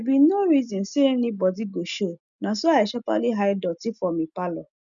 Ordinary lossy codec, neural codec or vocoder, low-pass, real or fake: none; none; 7.2 kHz; real